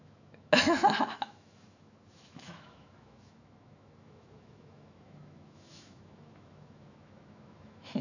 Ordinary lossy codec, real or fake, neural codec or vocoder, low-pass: none; fake; autoencoder, 48 kHz, 128 numbers a frame, DAC-VAE, trained on Japanese speech; 7.2 kHz